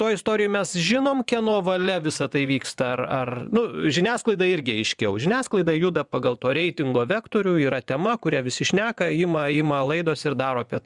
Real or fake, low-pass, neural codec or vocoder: fake; 10.8 kHz; vocoder, 24 kHz, 100 mel bands, Vocos